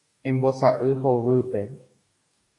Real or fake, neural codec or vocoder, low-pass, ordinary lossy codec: fake; codec, 44.1 kHz, 2.6 kbps, DAC; 10.8 kHz; AAC, 48 kbps